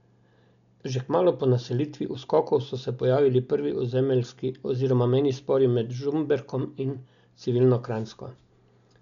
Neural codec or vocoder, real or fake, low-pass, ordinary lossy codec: none; real; 7.2 kHz; none